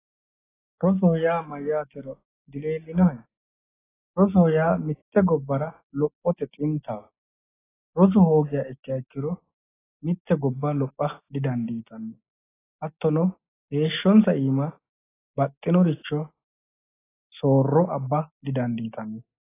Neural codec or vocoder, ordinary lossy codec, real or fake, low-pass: none; AAC, 16 kbps; real; 3.6 kHz